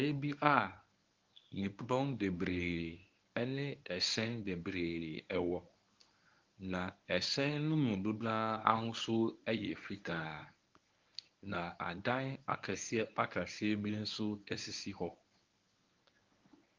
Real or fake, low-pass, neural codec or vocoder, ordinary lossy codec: fake; 7.2 kHz; codec, 24 kHz, 0.9 kbps, WavTokenizer, medium speech release version 1; Opus, 32 kbps